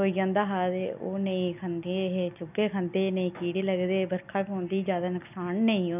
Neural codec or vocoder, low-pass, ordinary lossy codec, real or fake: none; 3.6 kHz; none; real